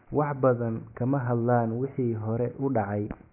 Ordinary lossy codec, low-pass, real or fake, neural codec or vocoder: none; 3.6 kHz; real; none